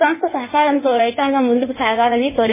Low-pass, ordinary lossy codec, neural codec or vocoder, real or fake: 3.6 kHz; MP3, 16 kbps; codec, 16 kHz in and 24 kHz out, 1.1 kbps, FireRedTTS-2 codec; fake